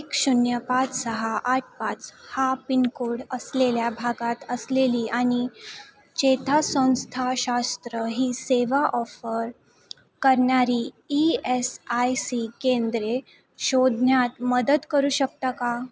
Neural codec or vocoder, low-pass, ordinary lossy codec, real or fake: none; none; none; real